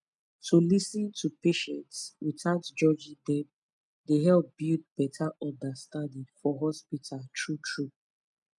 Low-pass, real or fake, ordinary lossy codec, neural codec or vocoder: 10.8 kHz; real; none; none